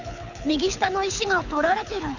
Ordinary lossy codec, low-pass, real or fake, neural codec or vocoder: none; 7.2 kHz; fake; codec, 24 kHz, 6 kbps, HILCodec